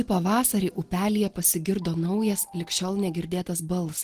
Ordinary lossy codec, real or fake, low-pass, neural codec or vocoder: Opus, 16 kbps; real; 14.4 kHz; none